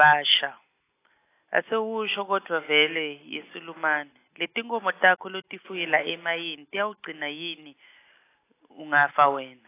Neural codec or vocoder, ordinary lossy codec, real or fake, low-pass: none; AAC, 24 kbps; real; 3.6 kHz